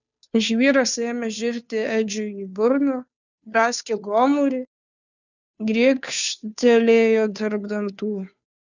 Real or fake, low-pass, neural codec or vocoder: fake; 7.2 kHz; codec, 16 kHz, 2 kbps, FunCodec, trained on Chinese and English, 25 frames a second